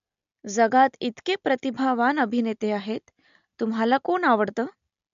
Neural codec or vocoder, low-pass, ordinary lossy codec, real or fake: none; 7.2 kHz; MP3, 64 kbps; real